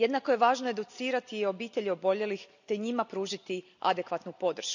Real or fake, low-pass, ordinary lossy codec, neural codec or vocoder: real; 7.2 kHz; none; none